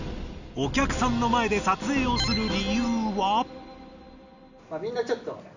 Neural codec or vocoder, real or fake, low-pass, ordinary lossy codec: none; real; 7.2 kHz; none